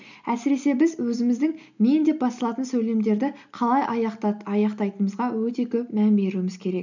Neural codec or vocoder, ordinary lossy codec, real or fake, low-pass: none; none; real; 7.2 kHz